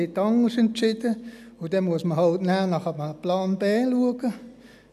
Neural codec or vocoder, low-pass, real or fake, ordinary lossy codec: none; 14.4 kHz; real; none